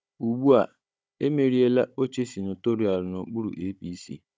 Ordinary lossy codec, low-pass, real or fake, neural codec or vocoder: none; none; fake; codec, 16 kHz, 16 kbps, FunCodec, trained on Chinese and English, 50 frames a second